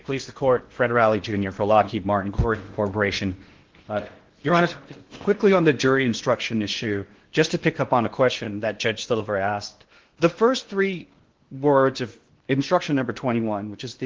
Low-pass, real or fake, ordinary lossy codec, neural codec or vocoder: 7.2 kHz; fake; Opus, 16 kbps; codec, 16 kHz in and 24 kHz out, 0.8 kbps, FocalCodec, streaming, 65536 codes